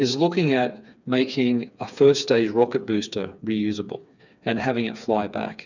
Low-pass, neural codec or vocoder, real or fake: 7.2 kHz; codec, 16 kHz, 4 kbps, FreqCodec, smaller model; fake